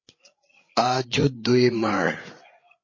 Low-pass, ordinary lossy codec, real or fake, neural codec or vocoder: 7.2 kHz; MP3, 32 kbps; fake; codec, 16 kHz, 8 kbps, FreqCodec, larger model